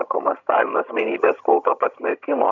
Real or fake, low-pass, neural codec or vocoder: fake; 7.2 kHz; vocoder, 22.05 kHz, 80 mel bands, HiFi-GAN